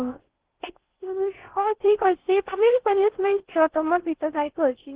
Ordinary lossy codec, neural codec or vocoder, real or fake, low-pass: Opus, 16 kbps; codec, 16 kHz in and 24 kHz out, 0.6 kbps, FocalCodec, streaming, 2048 codes; fake; 3.6 kHz